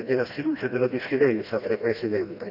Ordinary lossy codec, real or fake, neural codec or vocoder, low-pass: none; fake; codec, 16 kHz, 1 kbps, FreqCodec, smaller model; 5.4 kHz